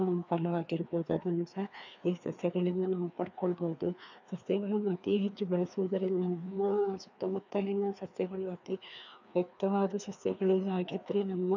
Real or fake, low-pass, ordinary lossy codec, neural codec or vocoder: fake; 7.2 kHz; none; codec, 16 kHz, 2 kbps, FreqCodec, larger model